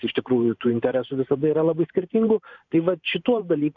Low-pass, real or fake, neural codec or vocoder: 7.2 kHz; fake; vocoder, 44.1 kHz, 128 mel bands every 512 samples, BigVGAN v2